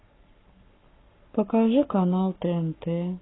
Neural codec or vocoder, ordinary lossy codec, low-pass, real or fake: codec, 44.1 kHz, 7.8 kbps, Pupu-Codec; AAC, 16 kbps; 7.2 kHz; fake